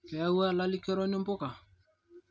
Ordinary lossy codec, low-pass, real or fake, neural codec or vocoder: none; none; real; none